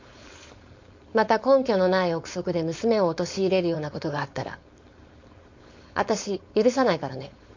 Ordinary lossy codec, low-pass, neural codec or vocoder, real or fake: MP3, 48 kbps; 7.2 kHz; codec, 16 kHz, 4.8 kbps, FACodec; fake